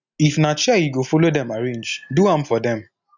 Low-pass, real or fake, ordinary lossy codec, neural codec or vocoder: 7.2 kHz; real; none; none